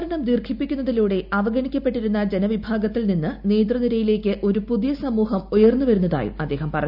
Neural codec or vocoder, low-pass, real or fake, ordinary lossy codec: none; 5.4 kHz; real; none